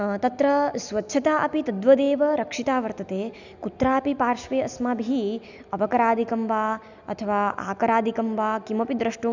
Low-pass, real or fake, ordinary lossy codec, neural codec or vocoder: 7.2 kHz; real; none; none